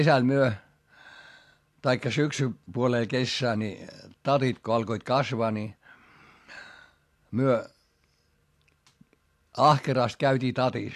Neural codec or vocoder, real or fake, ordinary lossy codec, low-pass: none; real; AAC, 64 kbps; 14.4 kHz